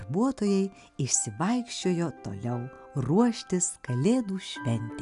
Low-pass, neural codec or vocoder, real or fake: 10.8 kHz; none; real